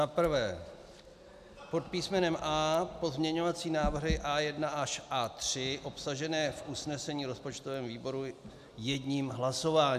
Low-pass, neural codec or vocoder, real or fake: 14.4 kHz; none; real